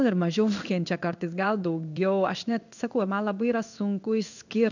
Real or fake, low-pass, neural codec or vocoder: fake; 7.2 kHz; codec, 16 kHz in and 24 kHz out, 1 kbps, XY-Tokenizer